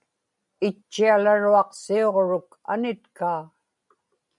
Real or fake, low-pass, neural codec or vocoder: real; 10.8 kHz; none